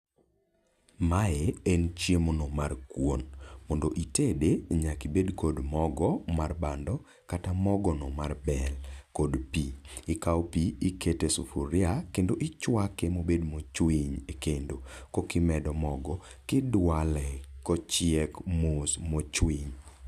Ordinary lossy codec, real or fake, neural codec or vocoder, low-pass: none; real; none; 14.4 kHz